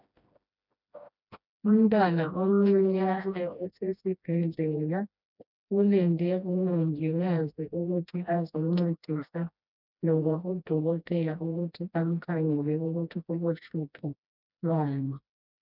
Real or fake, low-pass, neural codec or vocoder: fake; 5.4 kHz; codec, 16 kHz, 1 kbps, FreqCodec, smaller model